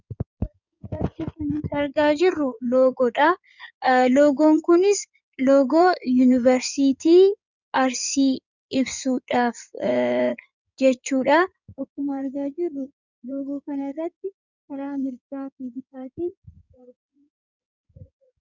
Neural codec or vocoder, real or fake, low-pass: codec, 16 kHz in and 24 kHz out, 2.2 kbps, FireRedTTS-2 codec; fake; 7.2 kHz